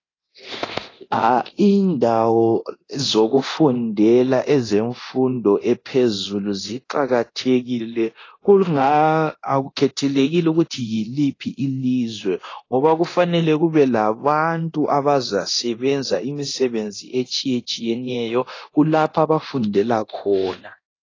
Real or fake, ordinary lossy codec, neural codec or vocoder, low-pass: fake; AAC, 32 kbps; codec, 24 kHz, 0.9 kbps, DualCodec; 7.2 kHz